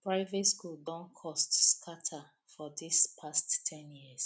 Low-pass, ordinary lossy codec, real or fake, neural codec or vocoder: none; none; real; none